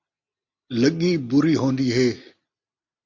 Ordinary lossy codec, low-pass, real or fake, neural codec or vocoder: MP3, 64 kbps; 7.2 kHz; real; none